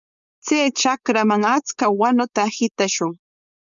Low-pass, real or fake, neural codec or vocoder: 7.2 kHz; fake; codec, 16 kHz, 4.8 kbps, FACodec